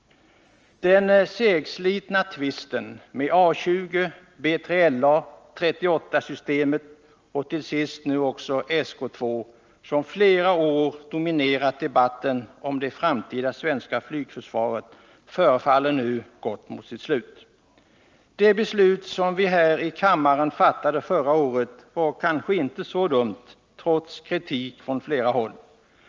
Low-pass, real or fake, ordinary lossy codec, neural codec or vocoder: 7.2 kHz; real; Opus, 24 kbps; none